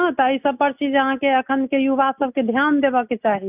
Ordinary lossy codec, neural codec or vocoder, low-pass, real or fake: none; none; 3.6 kHz; real